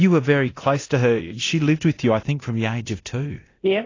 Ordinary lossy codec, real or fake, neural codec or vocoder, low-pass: AAC, 32 kbps; fake; codec, 24 kHz, 0.9 kbps, DualCodec; 7.2 kHz